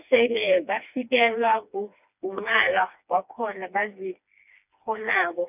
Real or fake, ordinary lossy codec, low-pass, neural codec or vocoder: fake; none; 3.6 kHz; codec, 16 kHz, 2 kbps, FreqCodec, smaller model